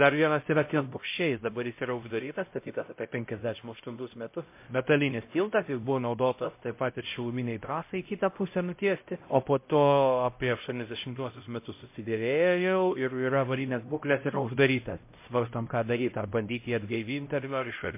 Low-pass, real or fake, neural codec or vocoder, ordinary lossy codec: 3.6 kHz; fake; codec, 16 kHz, 0.5 kbps, X-Codec, HuBERT features, trained on LibriSpeech; MP3, 24 kbps